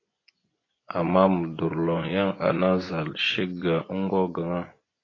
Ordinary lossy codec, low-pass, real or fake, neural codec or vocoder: AAC, 32 kbps; 7.2 kHz; fake; vocoder, 24 kHz, 100 mel bands, Vocos